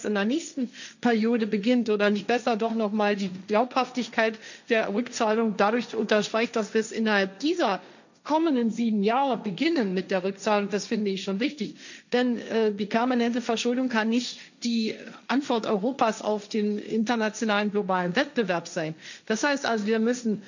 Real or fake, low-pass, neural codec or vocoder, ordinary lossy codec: fake; 7.2 kHz; codec, 16 kHz, 1.1 kbps, Voila-Tokenizer; none